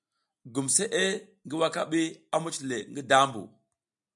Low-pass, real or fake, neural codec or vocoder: 10.8 kHz; real; none